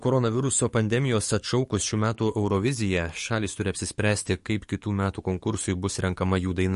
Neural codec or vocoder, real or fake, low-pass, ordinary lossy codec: codec, 44.1 kHz, 7.8 kbps, DAC; fake; 14.4 kHz; MP3, 48 kbps